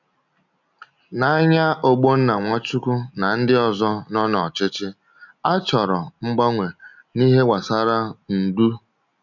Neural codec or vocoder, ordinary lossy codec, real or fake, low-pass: none; none; real; 7.2 kHz